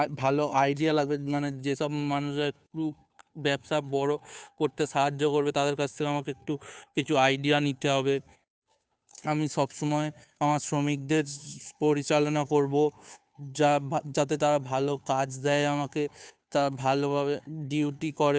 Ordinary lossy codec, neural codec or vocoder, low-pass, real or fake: none; codec, 16 kHz, 2 kbps, FunCodec, trained on Chinese and English, 25 frames a second; none; fake